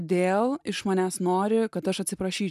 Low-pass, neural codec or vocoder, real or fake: 14.4 kHz; none; real